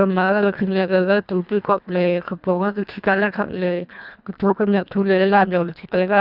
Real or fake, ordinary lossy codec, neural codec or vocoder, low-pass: fake; none; codec, 24 kHz, 1.5 kbps, HILCodec; 5.4 kHz